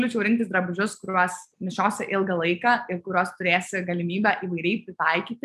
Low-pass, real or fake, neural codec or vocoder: 14.4 kHz; real; none